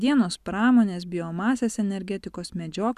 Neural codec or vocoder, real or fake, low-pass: none; real; 14.4 kHz